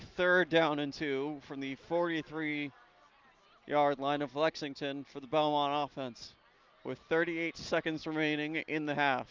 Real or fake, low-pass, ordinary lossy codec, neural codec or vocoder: real; 7.2 kHz; Opus, 24 kbps; none